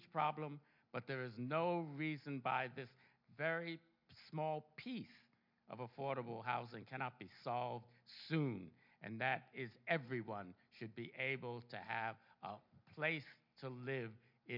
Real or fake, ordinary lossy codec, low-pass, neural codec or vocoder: real; MP3, 48 kbps; 5.4 kHz; none